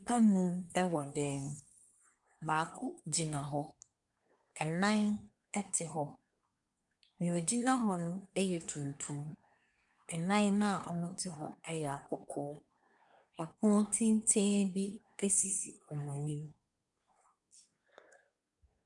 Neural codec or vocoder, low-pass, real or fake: codec, 24 kHz, 1 kbps, SNAC; 10.8 kHz; fake